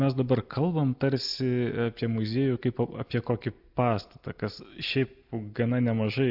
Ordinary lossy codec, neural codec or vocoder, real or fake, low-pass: AAC, 48 kbps; none; real; 5.4 kHz